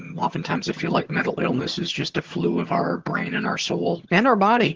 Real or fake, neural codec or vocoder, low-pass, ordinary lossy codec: fake; vocoder, 22.05 kHz, 80 mel bands, HiFi-GAN; 7.2 kHz; Opus, 16 kbps